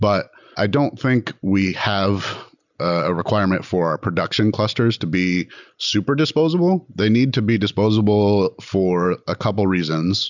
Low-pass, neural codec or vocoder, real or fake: 7.2 kHz; none; real